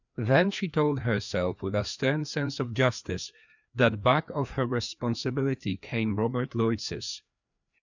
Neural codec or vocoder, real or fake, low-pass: codec, 16 kHz, 2 kbps, FreqCodec, larger model; fake; 7.2 kHz